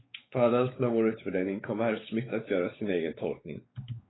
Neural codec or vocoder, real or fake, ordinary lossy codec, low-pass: codec, 16 kHz, 4 kbps, X-Codec, WavLM features, trained on Multilingual LibriSpeech; fake; AAC, 16 kbps; 7.2 kHz